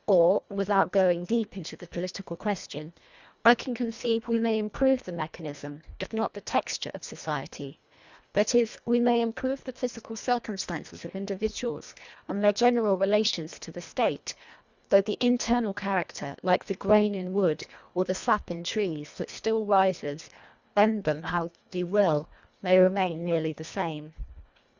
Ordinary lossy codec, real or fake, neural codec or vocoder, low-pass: Opus, 64 kbps; fake; codec, 24 kHz, 1.5 kbps, HILCodec; 7.2 kHz